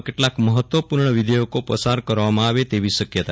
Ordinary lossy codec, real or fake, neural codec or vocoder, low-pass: none; real; none; none